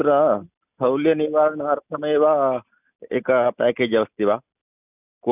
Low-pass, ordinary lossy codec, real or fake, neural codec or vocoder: 3.6 kHz; none; real; none